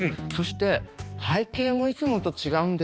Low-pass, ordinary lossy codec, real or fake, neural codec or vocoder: none; none; fake; codec, 16 kHz, 2 kbps, X-Codec, HuBERT features, trained on balanced general audio